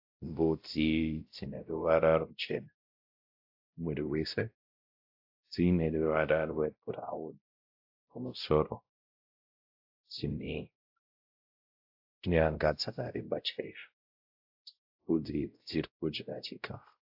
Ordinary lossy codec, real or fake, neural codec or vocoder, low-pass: AAC, 48 kbps; fake; codec, 16 kHz, 0.5 kbps, X-Codec, WavLM features, trained on Multilingual LibriSpeech; 5.4 kHz